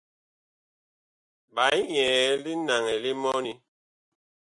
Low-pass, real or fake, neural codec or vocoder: 10.8 kHz; real; none